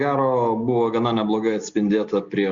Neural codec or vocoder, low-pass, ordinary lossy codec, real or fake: none; 7.2 kHz; Opus, 64 kbps; real